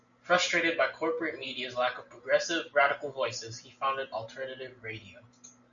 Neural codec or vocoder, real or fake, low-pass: none; real; 7.2 kHz